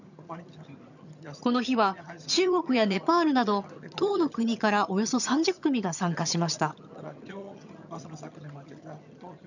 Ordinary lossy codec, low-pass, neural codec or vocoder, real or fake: none; 7.2 kHz; vocoder, 22.05 kHz, 80 mel bands, HiFi-GAN; fake